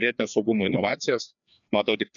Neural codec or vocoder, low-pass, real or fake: codec, 16 kHz, 2 kbps, FreqCodec, larger model; 7.2 kHz; fake